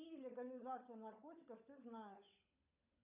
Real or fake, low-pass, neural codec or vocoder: fake; 3.6 kHz; codec, 16 kHz, 16 kbps, FunCodec, trained on LibriTTS, 50 frames a second